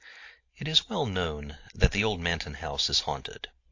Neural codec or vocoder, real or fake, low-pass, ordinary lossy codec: none; real; 7.2 kHz; AAC, 48 kbps